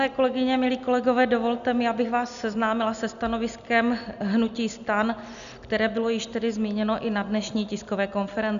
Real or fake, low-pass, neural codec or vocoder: real; 7.2 kHz; none